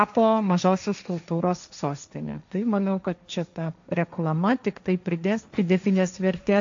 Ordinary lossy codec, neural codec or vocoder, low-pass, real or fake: AAC, 64 kbps; codec, 16 kHz, 1.1 kbps, Voila-Tokenizer; 7.2 kHz; fake